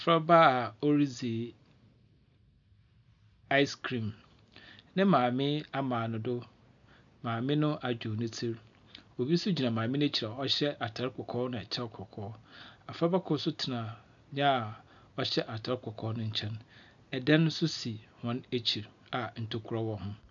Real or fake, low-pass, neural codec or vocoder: real; 7.2 kHz; none